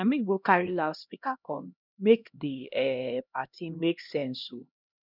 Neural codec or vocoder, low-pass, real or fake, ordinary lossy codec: codec, 16 kHz, 1 kbps, X-Codec, HuBERT features, trained on LibriSpeech; 5.4 kHz; fake; none